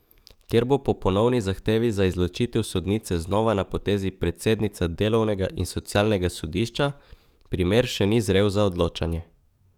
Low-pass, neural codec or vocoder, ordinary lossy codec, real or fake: 19.8 kHz; codec, 44.1 kHz, 7.8 kbps, DAC; none; fake